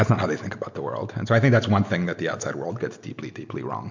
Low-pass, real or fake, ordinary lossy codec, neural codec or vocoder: 7.2 kHz; real; MP3, 64 kbps; none